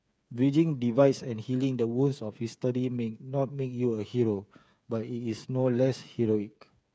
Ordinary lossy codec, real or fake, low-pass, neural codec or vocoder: none; fake; none; codec, 16 kHz, 8 kbps, FreqCodec, smaller model